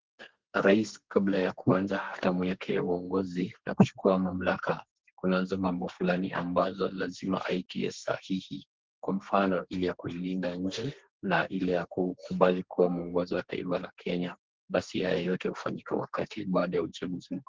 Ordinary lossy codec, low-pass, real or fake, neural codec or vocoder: Opus, 16 kbps; 7.2 kHz; fake; codec, 32 kHz, 1.9 kbps, SNAC